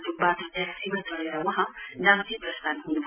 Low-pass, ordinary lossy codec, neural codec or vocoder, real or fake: 3.6 kHz; none; none; real